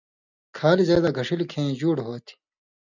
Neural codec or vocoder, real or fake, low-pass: none; real; 7.2 kHz